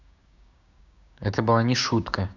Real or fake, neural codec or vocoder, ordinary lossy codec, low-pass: fake; codec, 16 kHz, 6 kbps, DAC; none; 7.2 kHz